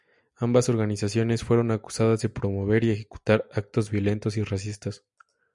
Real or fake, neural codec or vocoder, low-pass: real; none; 10.8 kHz